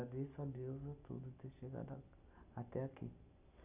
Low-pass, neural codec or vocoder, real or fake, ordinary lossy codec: 3.6 kHz; none; real; none